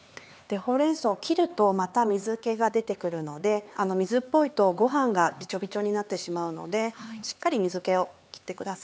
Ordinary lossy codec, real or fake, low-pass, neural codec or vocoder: none; fake; none; codec, 16 kHz, 4 kbps, X-Codec, HuBERT features, trained on LibriSpeech